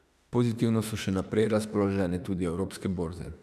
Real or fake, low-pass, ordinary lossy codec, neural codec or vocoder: fake; 14.4 kHz; none; autoencoder, 48 kHz, 32 numbers a frame, DAC-VAE, trained on Japanese speech